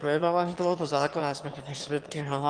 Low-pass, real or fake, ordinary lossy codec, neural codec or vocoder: 9.9 kHz; fake; Opus, 24 kbps; autoencoder, 22.05 kHz, a latent of 192 numbers a frame, VITS, trained on one speaker